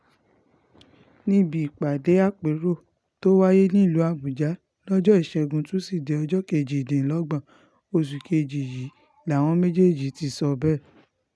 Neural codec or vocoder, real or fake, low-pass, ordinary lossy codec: none; real; none; none